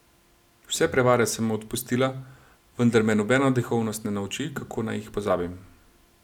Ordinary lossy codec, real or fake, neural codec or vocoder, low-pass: none; real; none; 19.8 kHz